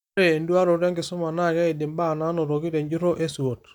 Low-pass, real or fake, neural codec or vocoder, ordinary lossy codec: 19.8 kHz; real; none; none